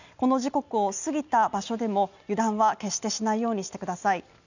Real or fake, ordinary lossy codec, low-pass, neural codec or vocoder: real; none; 7.2 kHz; none